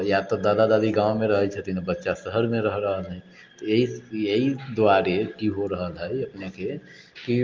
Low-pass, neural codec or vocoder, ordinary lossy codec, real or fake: 7.2 kHz; none; Opus, 24 kbps; real